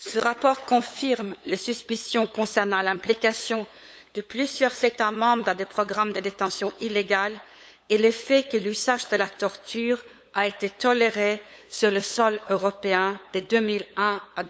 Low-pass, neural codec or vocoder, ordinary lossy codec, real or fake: none; codec, 16 kHz, 8 kbps, FunCodec, trained on LibriTTS, 25 frames a second; none; fake